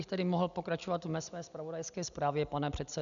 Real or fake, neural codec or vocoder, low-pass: real; none; 7.2 kHz